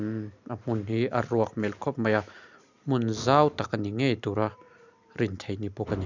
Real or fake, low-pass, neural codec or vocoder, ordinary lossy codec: real; 7.2 kHz; none; none